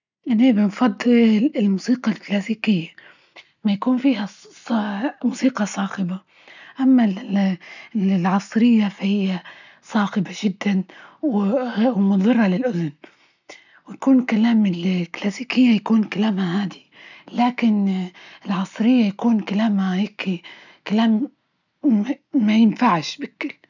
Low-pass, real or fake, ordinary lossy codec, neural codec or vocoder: 7.2 kHz; real; none; none